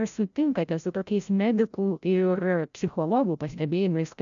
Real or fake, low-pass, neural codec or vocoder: fake; 7.2 kHz; codec, 16 kHz, 0.5 kbps, FreqCodec, larger model